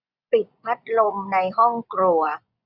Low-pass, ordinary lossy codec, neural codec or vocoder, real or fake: 5.4 kHz; none; vocoder, 24 kHz, 100 mel bands, Vocos; fake